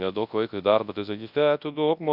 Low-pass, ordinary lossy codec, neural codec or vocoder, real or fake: 5.4 kHz; AAC, 48 kbps; codec, 24 kHz, 0.9 kbps, WavTokenizer, large speech release; fake